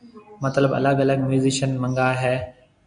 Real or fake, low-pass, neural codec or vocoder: real; 9.9 kHz; none